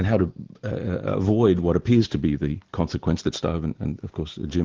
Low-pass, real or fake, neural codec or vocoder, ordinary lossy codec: 7.2 kHz; real; none; Opus, 16 kbps